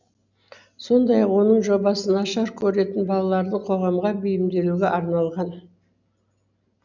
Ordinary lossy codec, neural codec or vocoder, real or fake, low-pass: none; none; real; 7.2 kHz